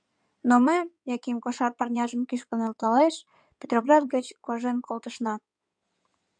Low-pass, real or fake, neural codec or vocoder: 9.9 kHz; fake; codec, 16 kHz in and 24 kHz out, 2.2 kbps, FireRedTTS-2 codec